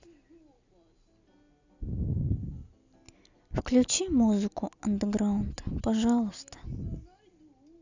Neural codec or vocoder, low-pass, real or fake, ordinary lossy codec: none; 7.2 kHz; real; Opus, 64 kbps